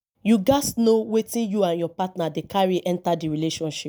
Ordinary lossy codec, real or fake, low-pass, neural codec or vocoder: none; real; none; none